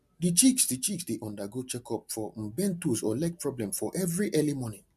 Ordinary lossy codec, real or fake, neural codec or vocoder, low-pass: MP3, 96 kbps; real; none; 14.4 kHz